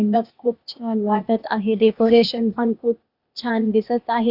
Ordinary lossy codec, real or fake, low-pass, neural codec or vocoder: none; fake; 5.4 kHz; codec, 16 kHz, 0.8 kbps, ZipCodec